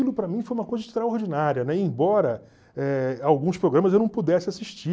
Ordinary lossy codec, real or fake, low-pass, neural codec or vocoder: none; real; none; none